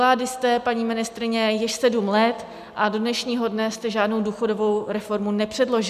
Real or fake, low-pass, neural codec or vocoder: real; 14.4 kHz; none